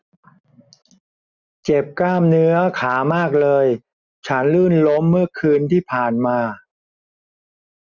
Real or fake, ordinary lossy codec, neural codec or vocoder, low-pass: real; none; none; 7.2 kHz